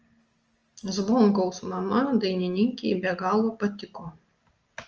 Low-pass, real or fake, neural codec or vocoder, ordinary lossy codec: 7.2 kHz; real; none; Opus, 24 kbps